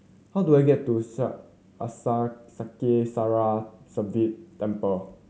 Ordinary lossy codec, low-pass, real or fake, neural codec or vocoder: none; none; real; none